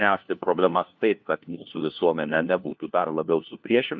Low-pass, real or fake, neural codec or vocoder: 7.2 kHz; fake; codec, 16 kHz, 1 kbps, FunCodec, trained on LibriTTS, 50 frames a second